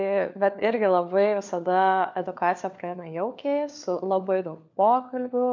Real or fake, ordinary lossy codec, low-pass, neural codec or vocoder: fake; MP3, 64 kbps; 7.2 kHz; codec, 16 kHz, 4 kbps, FunCodec, trained on LibriTTS, 50 frames a second